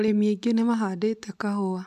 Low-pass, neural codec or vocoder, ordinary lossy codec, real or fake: 14.4 kHz; none; none; real